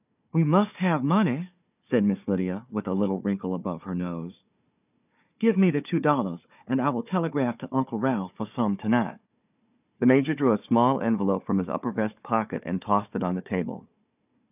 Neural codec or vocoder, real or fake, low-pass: codec, 16 kHz, 4 kbps, FunCodec, trained on Chinese and English, 50 frames a second; fake; 3.6 kHz